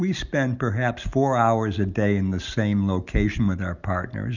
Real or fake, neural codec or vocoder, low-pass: real; none; 7.2 kHz